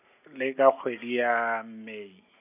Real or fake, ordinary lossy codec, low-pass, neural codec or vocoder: real; none; 3.6 kHz; none